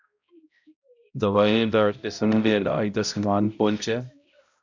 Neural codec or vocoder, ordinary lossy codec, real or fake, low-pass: codec, 16 kHz, 0.5 kbps, X-Codec, HuBERT features, trained on balanced general audio; MP3, 64 kbps; fake; 7.2 kHz